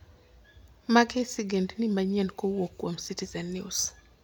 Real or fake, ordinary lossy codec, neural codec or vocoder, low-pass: real; none; none; none